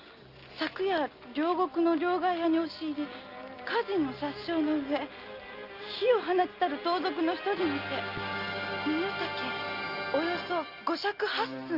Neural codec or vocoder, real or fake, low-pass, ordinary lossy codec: none; real; 5.4 kHz; Opus, 24 kbps